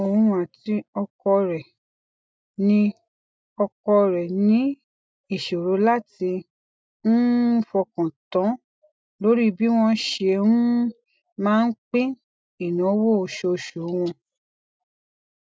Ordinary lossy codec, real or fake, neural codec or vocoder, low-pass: none; real; none; none